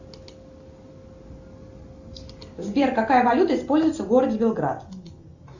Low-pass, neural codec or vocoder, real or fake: 7.2 kHz; none; real